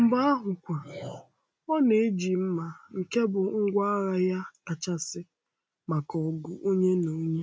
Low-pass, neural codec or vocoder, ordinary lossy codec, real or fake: none; none; none; real